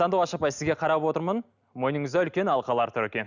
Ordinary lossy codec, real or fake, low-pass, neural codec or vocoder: none; real; 7.2 kHz; none